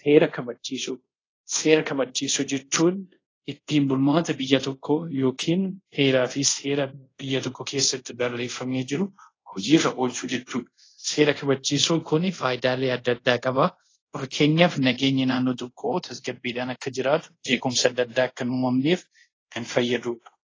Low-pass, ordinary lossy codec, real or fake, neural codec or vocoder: 7.2 kHz; AAC, 32 kbps; fake; codec, 24 kHz, 0.5 kbps, DualCodec